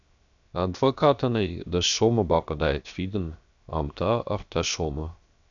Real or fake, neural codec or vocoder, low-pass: fake; codec, 16 kHz, 0.7 kbps, FocalCodec; 7.2 kHz